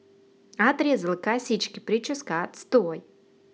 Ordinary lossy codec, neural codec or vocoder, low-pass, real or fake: none; none; none; real